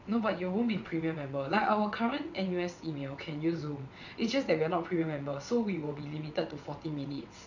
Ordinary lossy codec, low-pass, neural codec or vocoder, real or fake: none; 7.2 kHz; vocoder, 22.05 kHz, 80 mel bands, WaveNeXt; fake